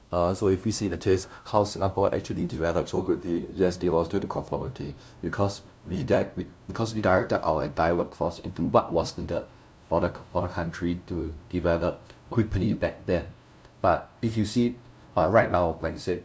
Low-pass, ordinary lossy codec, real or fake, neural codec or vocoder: none; none; fake; codec, 16 kHz, 0.5 kbps, FunCodec, trained on LibriTTS, 25 frames a second